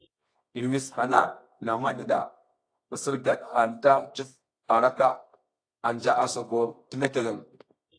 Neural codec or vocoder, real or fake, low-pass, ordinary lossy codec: codec, 24 kHz, 0.9 kbps, WavTokenizer, medium music audio release; fake; 9.9 kHz; AAC, 48 kbps